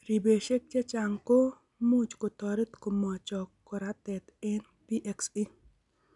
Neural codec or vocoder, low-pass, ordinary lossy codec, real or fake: none; 10.8 kHz; none; real